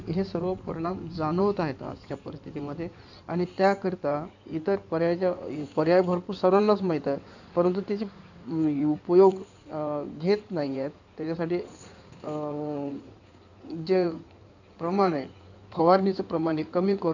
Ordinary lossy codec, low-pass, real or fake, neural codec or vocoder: none; 7.2 kHz; fake; codec, 16 kHz in and 24 kHz out, 2.2 kbps, FireRedTTS-2 codec